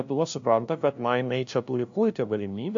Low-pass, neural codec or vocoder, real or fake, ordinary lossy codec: 7.2 kHz; codec, 16 kHz, 0.5 kbps, FunCodec, trained on LibriTTS, 25 frames a second; fake; AAC, 64 kbps